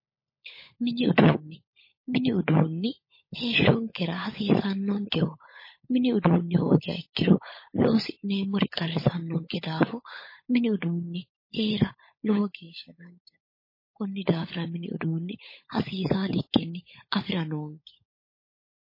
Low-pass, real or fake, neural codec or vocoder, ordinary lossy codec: 5.4 kHz; fake; codec, 16 kHz, 16 kbps, FunCodec, trained on LibriTTS, 50 frames a second; MP3, 24 kbps